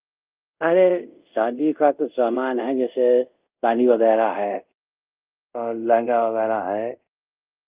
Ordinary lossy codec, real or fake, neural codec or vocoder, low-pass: Opus, 24 kbps; fake; codec, 24 kHz, 0.5 kbps, DualCodec; 3.6 kHz